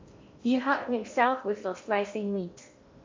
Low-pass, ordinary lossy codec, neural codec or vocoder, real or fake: 7.2 kHz; MP3, 48 kbps; codec, 16 kHz in and 24 kHz out, 0.8 kbps, FocalCodec, streaming, 65536 codes; fake